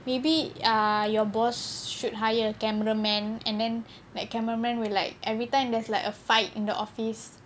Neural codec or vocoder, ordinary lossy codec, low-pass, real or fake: none; none; none; real